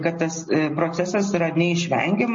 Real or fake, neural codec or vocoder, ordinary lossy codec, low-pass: real; none; MP3, 32 kbps; 7.2 kHz